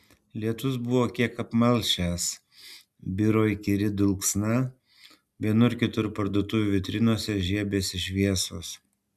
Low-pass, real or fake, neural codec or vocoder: 14.4 kHz; real; none